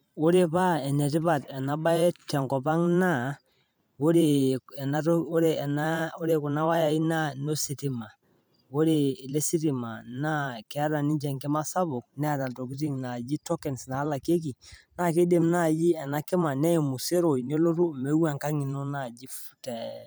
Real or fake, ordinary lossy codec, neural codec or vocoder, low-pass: fake; none; vocoder, 44.1 kHz, 128 mel bands every 512 samples, BigVGAN v2; none